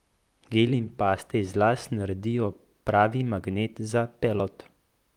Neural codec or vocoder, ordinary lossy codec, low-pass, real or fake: vocoder, 44.1 kHz, 128 mel bands, Pupu-Vocoder; Opus, 32 kbps; 19.8 kHz; fake